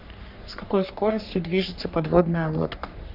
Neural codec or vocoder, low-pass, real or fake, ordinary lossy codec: codec, 44.1 kHz, 3.4 kbps, Pupu-Codec; 5.4 kHz; fake; none